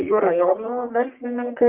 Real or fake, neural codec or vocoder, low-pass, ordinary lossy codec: fake; codec, 44.1 kHz, 1.7 kbps, Pupu-Codec; 3.6 kHz; Opus, 32 kbps